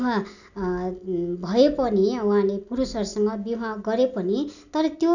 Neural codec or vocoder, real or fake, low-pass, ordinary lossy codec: none; real; 7.2 kHz; none